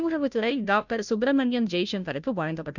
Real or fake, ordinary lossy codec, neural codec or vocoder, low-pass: fake; none; codec, 16 kHz, 0.5 kbps, FunCodec, trained on Chinese and English, 25 frames a second; 7.2 kHz